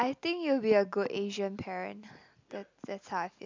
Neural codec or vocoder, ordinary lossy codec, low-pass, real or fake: none; MP3, 64 kbps; 7.2 kHz; real